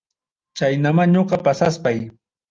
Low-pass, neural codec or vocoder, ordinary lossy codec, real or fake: 7.2 kHz; none; Opus, 32 kbps; real